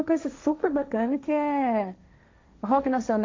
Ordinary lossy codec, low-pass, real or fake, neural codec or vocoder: MP3, 48 kbps; 7.2 kHz; fake; codec, 16 kHz, 1.1 kbps, Voila-Tokenizer